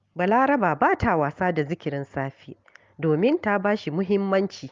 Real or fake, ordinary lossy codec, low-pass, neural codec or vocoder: real; Opus, 32 kbps; 7.2 kHz; none